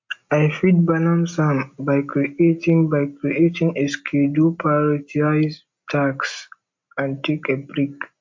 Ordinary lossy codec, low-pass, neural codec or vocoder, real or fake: MP3, 48 kbps; 7.2 kHz; none; real